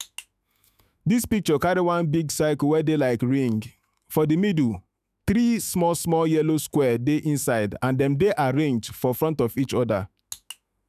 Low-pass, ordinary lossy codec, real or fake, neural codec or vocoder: 14.4 kHz; none; fake; autoencoder, 48 kHz, 128 numbers a frame, DAC-VAE, trained on Japanese speech